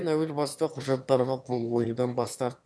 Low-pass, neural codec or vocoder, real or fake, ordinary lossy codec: none; autoencoder, 22.05 kHz, a latent of 192 numbers a frame, VITS, trained on one speaker; fake; none